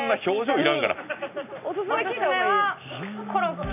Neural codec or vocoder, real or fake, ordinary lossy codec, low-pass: none; real; none; 3.6 kHz